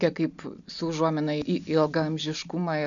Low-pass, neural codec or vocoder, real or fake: 7.2 kHz; none; real